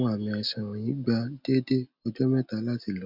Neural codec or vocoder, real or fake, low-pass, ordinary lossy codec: none; real; 5.4 kHz; none